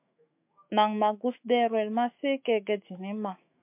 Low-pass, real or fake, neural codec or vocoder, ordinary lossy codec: 3.6 kHz; fake; autoencoder, 48 kHz, 128 numbers a frame, DAC-VAE, trained on Japanese speech; MP3, 32 kbps